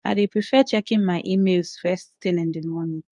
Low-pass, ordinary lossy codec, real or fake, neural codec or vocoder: 10.8 kHz; none; fake; codec, 24 kHz, 0.9 kbps, WavTokenizer, medium speech release version 2